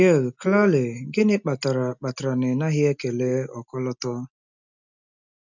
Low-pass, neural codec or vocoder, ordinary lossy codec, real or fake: 7.2 kHz; none; none; real